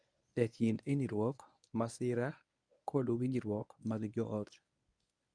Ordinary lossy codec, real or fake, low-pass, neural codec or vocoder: none; fake; 9.9 kHz; codec, 24 kHz, 0.9 kbps, WavTokenizer, medium speech release version 1